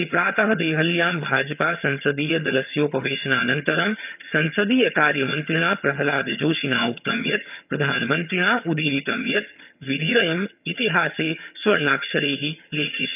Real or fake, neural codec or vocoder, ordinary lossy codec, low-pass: fake; vocoder, 22.05 kHz, 80 mel bands, HiFi-GAN; none; 3.6 kHz